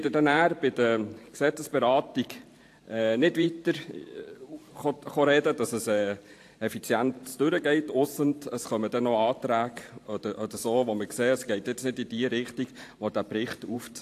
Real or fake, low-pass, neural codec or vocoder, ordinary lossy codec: fake; 14.4 kHz; vocoder, 44.1 kHz, 128 mel bands every 512 samples, BigVGAN v2; AAC, 64 kbps